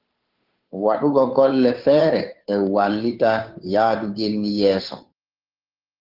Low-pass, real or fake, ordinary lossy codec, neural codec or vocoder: 5.4 kHz; fake; Opus, 32 kbps; codec, 16 kHz, 2 kbps, FunCodec, trained on Chinese and English, 25 frames a second